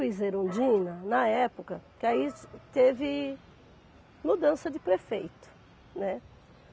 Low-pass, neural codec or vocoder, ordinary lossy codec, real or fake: none; none; none; real